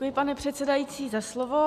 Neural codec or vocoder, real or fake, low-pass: none; real; 14.4 kHz